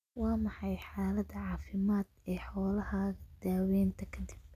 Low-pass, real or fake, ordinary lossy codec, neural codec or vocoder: 14.4 kHz; real; none; none